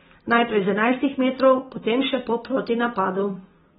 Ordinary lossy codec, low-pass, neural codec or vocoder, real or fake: AAC, 16 kbps; 7.2 kHz; none; real